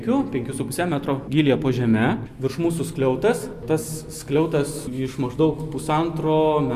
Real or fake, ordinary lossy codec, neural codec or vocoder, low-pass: real; Opus, 64 kbps; none; 14.4 kHz